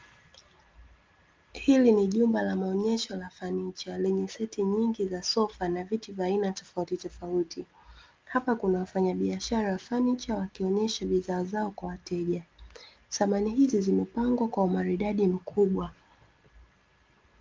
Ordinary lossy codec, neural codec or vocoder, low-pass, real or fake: Opus, 32 kbps; none; 7.2 kHz; real